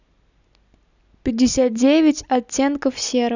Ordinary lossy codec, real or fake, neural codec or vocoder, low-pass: none; real; none; 7.2 kHz